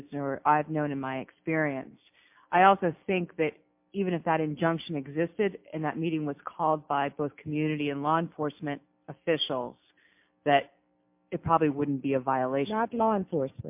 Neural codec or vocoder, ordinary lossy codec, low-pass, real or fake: none; MP3, 32 kbps; 3.6 kHz; real